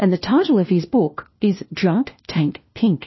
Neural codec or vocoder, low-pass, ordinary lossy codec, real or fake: codec, 16 kHz, 1 kbps, FunCodec, trained on LibriTTS, 50 frames a second; 7.2 kHz; MP3, 24 kbps; fake